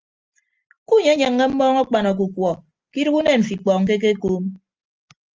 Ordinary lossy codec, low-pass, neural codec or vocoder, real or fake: Opus, 24 kbps; 7.2 kHz; none; real